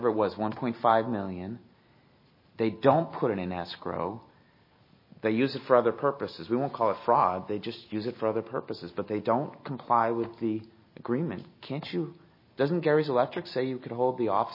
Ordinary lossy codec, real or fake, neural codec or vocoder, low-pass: MP3, 24 kbps; real; none; 5.4 kHz